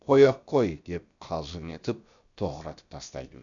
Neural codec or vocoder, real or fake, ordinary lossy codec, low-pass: codec, 16 kHz, about 1 kbps, DyCAST, with the encoder's durations; fake; none; 7.2 kHz